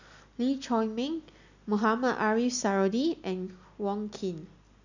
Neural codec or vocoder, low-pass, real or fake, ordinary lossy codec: none; 7.2 kHz; real; none